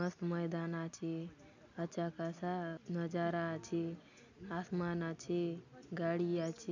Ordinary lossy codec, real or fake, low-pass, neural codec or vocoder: none; real; 7.2 kHz; none